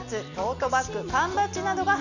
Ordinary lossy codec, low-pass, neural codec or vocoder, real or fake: none; 7.2 kHz; none; real